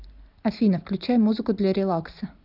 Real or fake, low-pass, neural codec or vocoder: real; 5.4 kHz; none